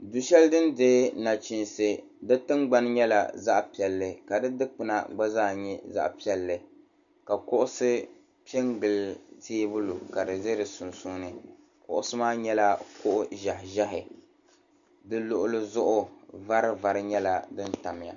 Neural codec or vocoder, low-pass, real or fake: none; 7.2 kHz; real